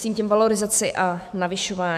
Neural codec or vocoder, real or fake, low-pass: autoencoder, 48 kHz, 128 numbers a frame, DAC-VAE, trained on Japanese speech; fake; 14.4 kHz